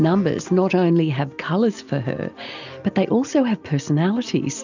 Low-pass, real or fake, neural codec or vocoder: 7.2 kHz; real; none